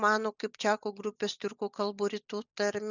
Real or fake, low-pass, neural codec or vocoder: real; 7.2 kHz; none